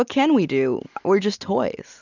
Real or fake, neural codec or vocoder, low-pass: real; none; 7.2 kHz